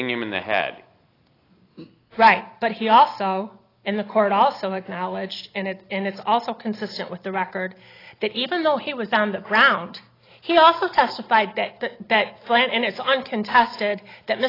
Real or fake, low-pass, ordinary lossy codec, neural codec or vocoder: real; 5.4 kHz; AAC, 24 kbps; none